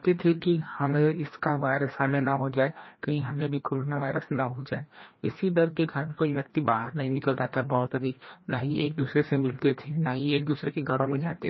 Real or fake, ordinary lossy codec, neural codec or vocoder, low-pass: fake; MP3, 24 kbps; codec, 16 kHz, 1 kbps, FreqCodec, larger model; 7.2 kHz